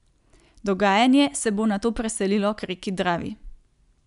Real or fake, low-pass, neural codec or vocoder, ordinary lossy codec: real; 10.8 kHz; none; none